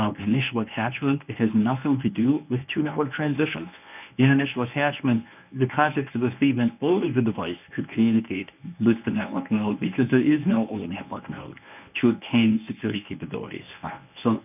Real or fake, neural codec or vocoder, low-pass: fake; codec, 24 kHz, 0.9 kbps, WavTokenizer, medium speech release version 1; 3.6 kHz